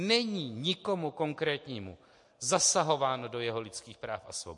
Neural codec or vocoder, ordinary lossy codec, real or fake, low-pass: none; MP3, 48 kbps; real; 10.8 kHz